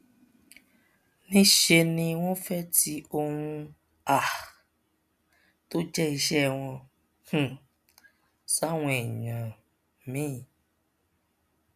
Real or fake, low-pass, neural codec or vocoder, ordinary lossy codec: real; 14.4 kHz; none; none